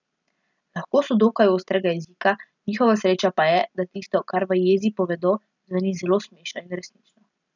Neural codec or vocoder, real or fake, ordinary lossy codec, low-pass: none; real; none; 7.2 kHz